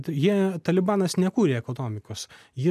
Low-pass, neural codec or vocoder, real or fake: 14.4 kHz; none; real